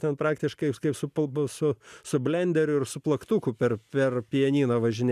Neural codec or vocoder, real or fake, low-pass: none; real; 14.4 kHz